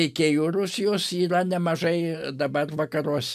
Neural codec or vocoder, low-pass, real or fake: none; 14.4 kHz; real